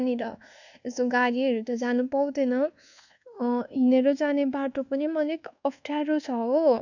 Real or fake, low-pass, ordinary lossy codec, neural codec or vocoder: fake; 7.2 kHz; none; codec, 24 kHz, 1.2 kbps, DualCodec